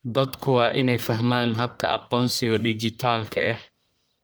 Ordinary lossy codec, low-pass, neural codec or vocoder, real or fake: none; none; codec, 44.1 kHz, 1.7 kbps, Pupu-Codec; fake